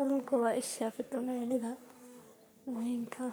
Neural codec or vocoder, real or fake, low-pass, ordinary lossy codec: codec, 44.1 kHz, 3.4 kbps, Pupu-Codec; fake; none; none